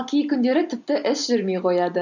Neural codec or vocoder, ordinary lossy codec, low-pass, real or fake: none; none; 7.2 kHz; real